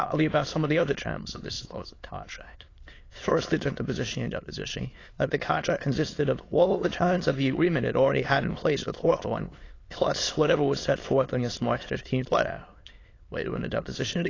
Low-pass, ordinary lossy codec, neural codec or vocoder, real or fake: 7.2 kHz; AAC, 32 kbps; autoencoder, 22.05 kHz, a latent of 192 numbers a frame, VITS, trained on many speakers; fake